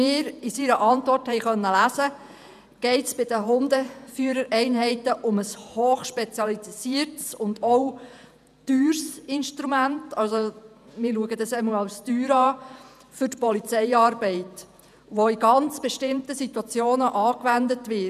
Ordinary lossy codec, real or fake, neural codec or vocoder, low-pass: none; fake; vocoder, 48 kHz, 128 mel bands, Vocos; 14.4 kHz